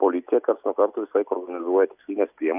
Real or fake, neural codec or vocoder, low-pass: real; none; 3.6 kHz